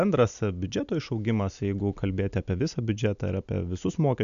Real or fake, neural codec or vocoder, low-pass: real; none; 7.2 kHz